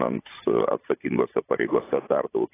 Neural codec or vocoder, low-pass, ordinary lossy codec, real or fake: vocoder, 44.1 kHz, 80 mel bands, Vocos; 3.6 kHz; AAC, 16 kbps; fake